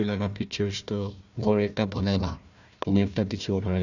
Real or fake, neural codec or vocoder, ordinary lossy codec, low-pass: fake; codec, 16 kHz, 1 kbps, FunCodec, trained on Chinese and English, 50 frames a second; none; 7.2 kHz